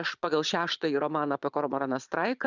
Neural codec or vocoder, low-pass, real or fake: vocoder, 22.05 kHz, 80 mel bands, Vocos; 7.2 kHz; fake